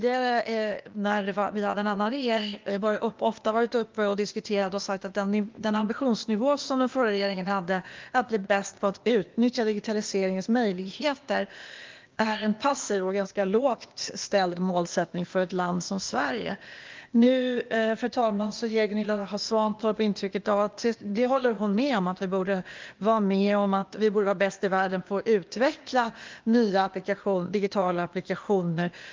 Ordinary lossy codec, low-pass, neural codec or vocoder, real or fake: Opus, 32 kbps; 7.2 kHz; codec, 16 kHz, 0.8 kbps, ZipCodec; fake